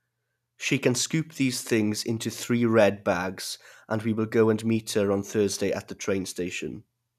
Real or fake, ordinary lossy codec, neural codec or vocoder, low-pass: real; none; none; 14.4 kHz